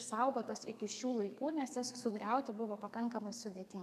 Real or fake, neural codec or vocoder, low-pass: fake; codec, 32 kHz, 1.9 kbps, SNAC; 14.4 kHz